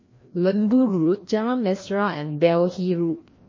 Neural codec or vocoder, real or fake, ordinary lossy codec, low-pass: codec, 16 kHz, 1 kbps, FreqCodec, larger model; fake; MP3, 32 kbps; 7.2 kHz